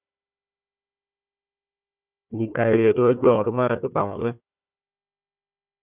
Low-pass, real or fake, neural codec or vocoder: 3.6 kHz; fake; codec, 16 kHz, 1 kbps, FunCodec, trained on Chinese and English, 50 frames a second